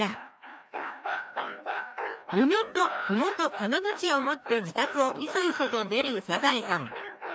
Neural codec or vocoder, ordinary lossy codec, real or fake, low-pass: codec, 16 kHz, 1 kbps, FreqCodec, larger model; none; fake; none